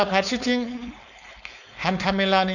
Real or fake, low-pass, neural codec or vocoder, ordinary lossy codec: fake; 7.2 kHz; codec, 16 kHz, 4.8 kbps, FACodec; none